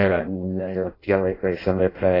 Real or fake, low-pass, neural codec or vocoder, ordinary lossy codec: fake; 5.4 kHz; codec, 16 kHz in and 24 kHz out, 0.6 kbps, FireRedTTS-2 codec; AAC, 24 kbps